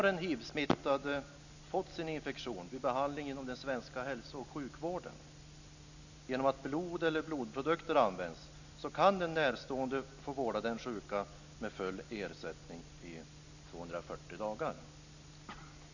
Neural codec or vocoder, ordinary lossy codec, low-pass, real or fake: none; none; 7.2 kHz; real